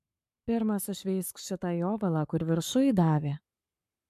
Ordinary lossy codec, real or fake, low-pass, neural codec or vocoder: AAC, 96 kbps; fake; 14.4 kHz; codec, 44.1 kHz, 7.8 kbps, Pupu-Codec